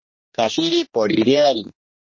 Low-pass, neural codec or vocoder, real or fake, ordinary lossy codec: 7.2 kHz; codec, 16 kHz, 1 kbps, X-Codec, HuBERT features, trained on general audio; fake; MP3, 32 kbps